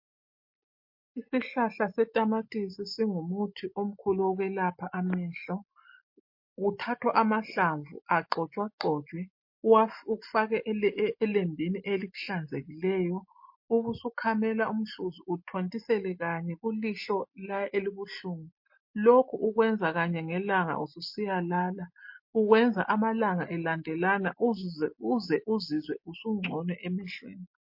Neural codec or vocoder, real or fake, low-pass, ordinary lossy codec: none; real; 5.4 kHz; MP3, 32 kbps